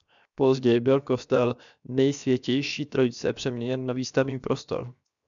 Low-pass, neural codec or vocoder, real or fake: 7.2 kHz; codec, 16 kHz, 0.7 kbps, FocalCodec; fake